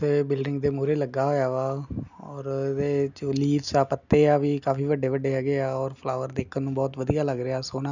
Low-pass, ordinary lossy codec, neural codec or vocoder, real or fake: 7.2 kHz; none; none; real